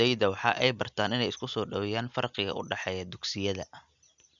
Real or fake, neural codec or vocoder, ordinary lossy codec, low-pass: real; none; none; 7.2 kHz